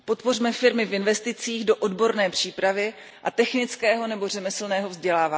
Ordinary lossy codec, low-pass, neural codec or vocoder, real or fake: none; none; none; real